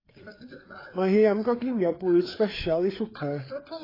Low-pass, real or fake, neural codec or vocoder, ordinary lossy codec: 5.4 kHz; fake; codec, 16 kHz, 4 kbps, FreqCodec, larger model; AAC, 24 kbps